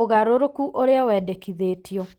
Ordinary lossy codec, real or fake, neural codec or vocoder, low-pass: Opus, 16 kbps; real; none; 19.8 kHz